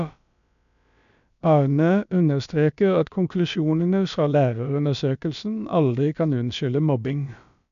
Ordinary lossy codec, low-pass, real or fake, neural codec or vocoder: none; 7.2 kHz; fake; codec, 16 kHz, about 1 kbps, DyCAST, with the encoder's durations